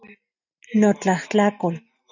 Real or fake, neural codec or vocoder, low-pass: real; none; 7.2 kHz